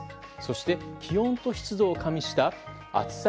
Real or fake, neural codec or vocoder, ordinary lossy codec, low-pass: real; none; none; none